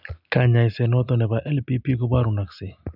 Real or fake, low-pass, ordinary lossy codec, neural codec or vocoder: real; 5.4 kHz; none; none